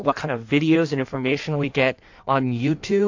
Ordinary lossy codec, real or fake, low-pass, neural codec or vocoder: AAC, 48 kbps; fake; 7.2 kHz; codec, 16 kHz in and 24 kHz out, 1.1 kbps, FireRedTTS-2 codec